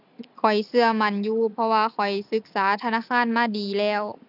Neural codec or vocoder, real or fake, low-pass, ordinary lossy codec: none; real; 5.4 kHz; none